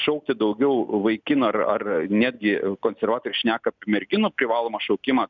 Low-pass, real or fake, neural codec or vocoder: 7.2 kHz; real; none